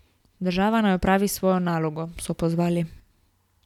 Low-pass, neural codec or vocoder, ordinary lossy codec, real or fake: 19.8 kHz; none; none; real